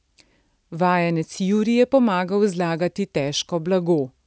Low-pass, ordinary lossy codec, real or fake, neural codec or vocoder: none; none; real; none